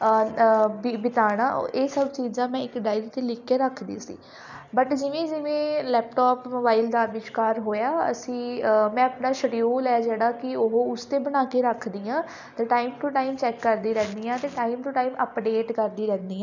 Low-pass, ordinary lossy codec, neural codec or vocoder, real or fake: 7.2 kHz; none; none; real